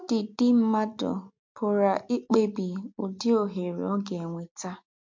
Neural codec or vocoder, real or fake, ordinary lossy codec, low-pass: none; real; MP3, 48 kbps; 7.2 kHz